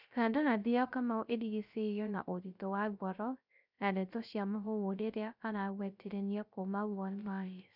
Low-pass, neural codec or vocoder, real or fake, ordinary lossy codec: 5.4 kHz; codec, 16 kHz, 0.3 kbps, FocalCodec; fake; none